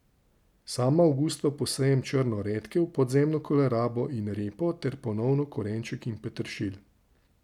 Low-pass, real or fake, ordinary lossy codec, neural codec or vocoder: 19.8 kHz; real; none; none